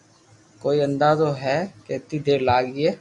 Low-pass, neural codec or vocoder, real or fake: 10.8 kHz; none; real